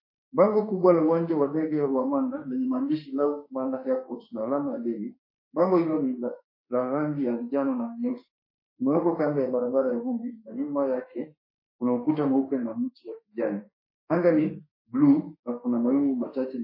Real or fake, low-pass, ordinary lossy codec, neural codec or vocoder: fake; 5.4 kHz; MP3, 24 kbps; autoencoder, 48 kHz, 32 numbers a frame, DAC-VAE, trained on Japanese speech